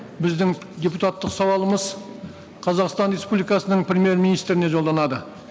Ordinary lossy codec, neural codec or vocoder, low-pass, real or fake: none; none; none; real